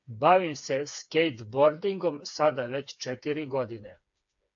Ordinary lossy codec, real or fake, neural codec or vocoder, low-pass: AAC, 64 kbps; fake; codec, 16 kHz, 4 kbps, FreqCodec, smaller model; 7.2 kHz